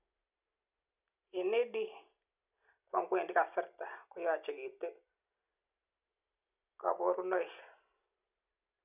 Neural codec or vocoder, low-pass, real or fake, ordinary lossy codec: none; 3.6 kHz; real; none